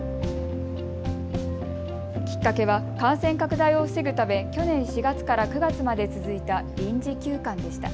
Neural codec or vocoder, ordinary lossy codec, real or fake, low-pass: none; none; real; none